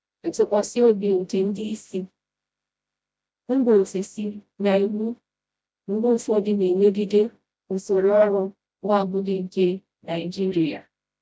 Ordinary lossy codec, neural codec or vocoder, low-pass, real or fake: none; codec, 16 kHz, 0.5 kbps, FreqCodec, smaller model; none; fake